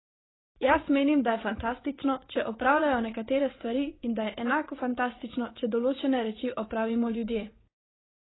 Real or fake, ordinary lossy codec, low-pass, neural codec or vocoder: fake; AAC, 16 kbps; 7.2 kHz; vocoder, 44.1 kHz, 80 mel bands, Vocos